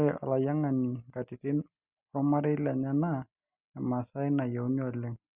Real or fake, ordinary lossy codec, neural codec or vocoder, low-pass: real; Opus, 64 kbps; none; 3.6 kHz